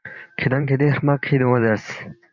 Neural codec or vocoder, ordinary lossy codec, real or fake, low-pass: vocoder, 44.1 kHz, 128 mel bands every 512 samples, BigVGAN v2; MP3, 64 kbps; fake; 7.2 kHz